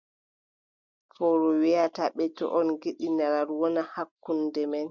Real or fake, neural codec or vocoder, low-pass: real; none; 7.2 kHz